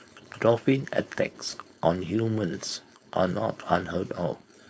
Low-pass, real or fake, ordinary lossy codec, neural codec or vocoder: none; fake; none; codec, 16 kHz, 4.8 kbps, FACodec